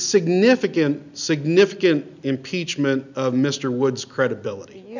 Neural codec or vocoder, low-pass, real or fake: none; 7.2 kHz; real